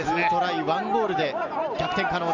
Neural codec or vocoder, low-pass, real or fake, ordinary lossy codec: none; 7.2 kHz; real; none